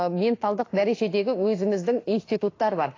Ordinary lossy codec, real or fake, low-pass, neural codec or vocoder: AAC, 32 kbps; fake; 7.2 kHz; autoencoder, 48 kHz, 32 numbers a frame, DAC-VAE, trained on Japanese speech